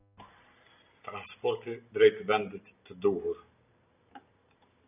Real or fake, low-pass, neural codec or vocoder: real; 3.6 kHz; none